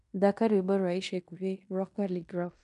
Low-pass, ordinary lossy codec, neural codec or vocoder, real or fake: 10.8 kHz; none; codec, 16 kHz in and 24 kHz out, 0.9 kbps, LongCat-Audio-Codec, fine tuned four codebook decoder; fake